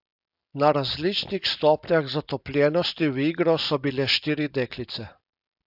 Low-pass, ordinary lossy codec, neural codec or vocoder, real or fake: 5.4 kHz; AAC, 48 kbps; none; real